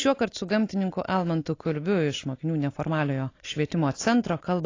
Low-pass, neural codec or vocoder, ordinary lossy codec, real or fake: 7.2 kHz; none; AAC, 32 kbps; real